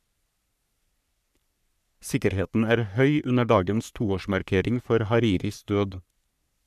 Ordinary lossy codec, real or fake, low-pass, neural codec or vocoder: none; fake; 14.4 kHz; codec, 44.1 kHz, 3.4 kbps, Pupu-Codec